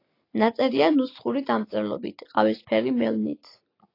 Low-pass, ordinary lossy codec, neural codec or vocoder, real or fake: 5.4 kHz; AAC, 32 kbps; none; real